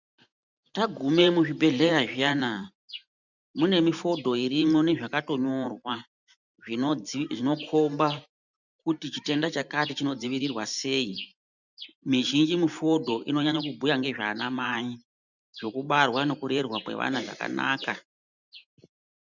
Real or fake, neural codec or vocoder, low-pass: fake; vocoder, 44.1 kHz, 128 mel bands every 512 samples, BigVGAN v2; 7.2 kHz